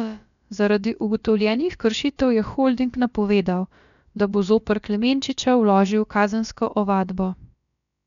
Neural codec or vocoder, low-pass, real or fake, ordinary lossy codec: codec, 16 kHz, about 1 kbps, DyCAST, with the encoder's durations; 7.2 kHz; fake; none